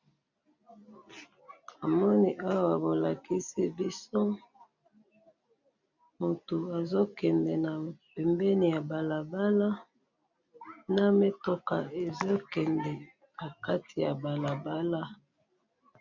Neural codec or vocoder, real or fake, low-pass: none; real; 7.2 kHz